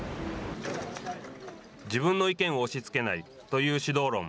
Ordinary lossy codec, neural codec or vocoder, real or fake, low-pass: none; none; real; none